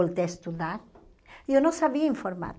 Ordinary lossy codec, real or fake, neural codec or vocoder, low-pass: none; real; none; none